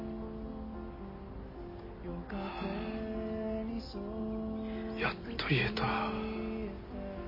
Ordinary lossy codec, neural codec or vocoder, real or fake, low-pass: MP3, 24 kbps; none; real; 5.4 kHz